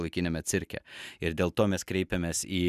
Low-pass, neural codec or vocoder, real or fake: 14.4 kHz; none; real